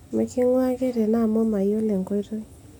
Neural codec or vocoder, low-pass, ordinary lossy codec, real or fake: none; none; none; real